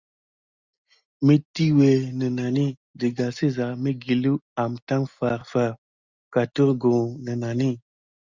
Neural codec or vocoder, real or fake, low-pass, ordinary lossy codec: none; real; 7.2 kHz; Opus, 64 kbps